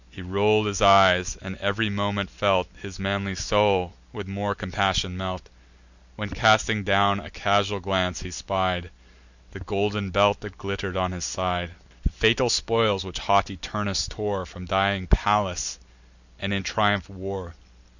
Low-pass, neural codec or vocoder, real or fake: 7.2 kHz; none; real